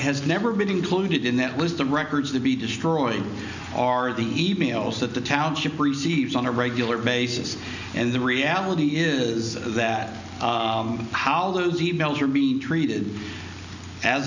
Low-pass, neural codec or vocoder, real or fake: 7.2 kHz; none; real